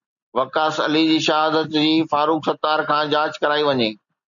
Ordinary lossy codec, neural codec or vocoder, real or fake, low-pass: MP3, 96 kbps; none; real; 7.2 kHz